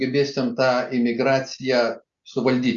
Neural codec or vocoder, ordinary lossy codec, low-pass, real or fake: none; Opus, 64 kbps; 7.2 kHz; real